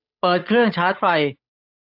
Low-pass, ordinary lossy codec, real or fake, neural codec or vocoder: 5.4 kHz; AAC, 48 kbps; fake; codec, 16 kHz, 8 kbps, FunCodec, trained on Chinese and English, 25 frames a second